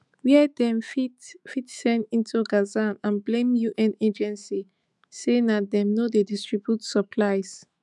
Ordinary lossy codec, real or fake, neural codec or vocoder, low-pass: none; fake; autoencoder, 48 kHz, 128 numbers a frame, DAC-VAE, trained on Japanese speech; 10.8 kHz